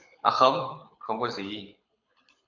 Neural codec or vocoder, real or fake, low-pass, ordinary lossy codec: codec, 24 kHz, 6 kbps, HILCodec; fake; 7.2 kHz; AAC, 48 kbps